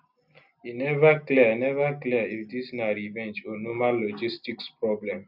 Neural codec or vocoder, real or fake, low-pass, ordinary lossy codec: none; real; 5.4 kHz; none